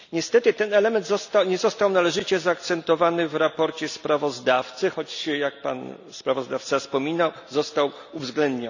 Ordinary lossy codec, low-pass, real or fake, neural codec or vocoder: none; 7.2 kHz; real; none